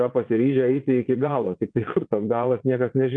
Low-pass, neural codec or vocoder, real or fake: 9.9 kHz; vocoder, 22.05 kHz, 80 mel bands, Vocos; fake